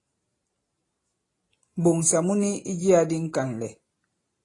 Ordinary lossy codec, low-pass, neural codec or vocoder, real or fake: AAC, 32 kbps; 10.8 kHz; none; real